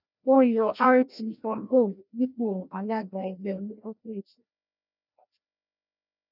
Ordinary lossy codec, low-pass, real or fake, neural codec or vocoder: none; 5.4 kHz; fake; codec, 16 kHz, 1 kbps, FreqCodec, larger model